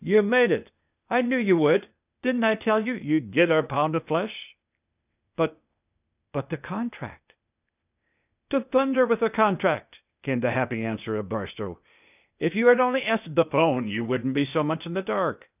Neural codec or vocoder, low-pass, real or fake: codec, 16 kHz, 0.8 kbps, ZipCodec; 3.6 kHz; fake